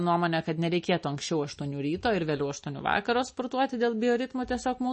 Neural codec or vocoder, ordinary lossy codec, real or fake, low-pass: none; MP3, 32 kbps; real; 10.8 kHz